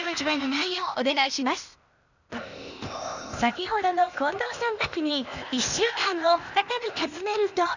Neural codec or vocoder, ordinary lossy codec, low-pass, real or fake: codec, 16 kHz, 0.8 kbps, ZipCodec; none; 7.2 kHz; fake